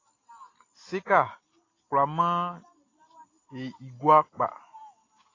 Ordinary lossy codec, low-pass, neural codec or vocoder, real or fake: AAC, 32 kbps; 7.2 kHz; none; real